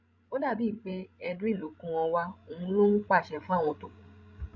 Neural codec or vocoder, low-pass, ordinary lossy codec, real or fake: codec, 16 kHz, 16 kbps, FreqCodec, larger model; 7.2 kHz; MP3, 64 kbps; fake